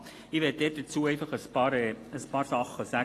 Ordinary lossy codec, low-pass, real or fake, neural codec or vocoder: AAC, 48 kbps; 14.4 kHz; real; none